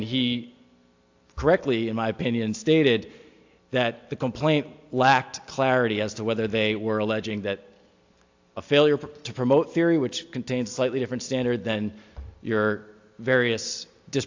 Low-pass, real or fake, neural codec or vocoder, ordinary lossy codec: 7.2 kHz; real; none; AAC, 48 kbps